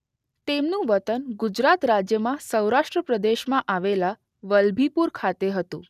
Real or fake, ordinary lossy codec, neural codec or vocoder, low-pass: real; none; none; 14.4 kHz